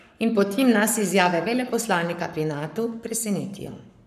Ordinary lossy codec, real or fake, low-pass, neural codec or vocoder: none; fake; 14.4 kHz; codec, 44.1 kHz, 7.8 kbps, Pupu-Codec